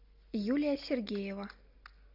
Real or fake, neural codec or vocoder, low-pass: real; none; 5.4 kHz